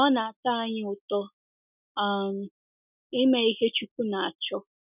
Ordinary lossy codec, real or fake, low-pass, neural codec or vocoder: none; real; 3.6 kHz; none